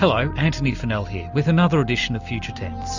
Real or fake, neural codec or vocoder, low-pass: real; none; 7.2 kHz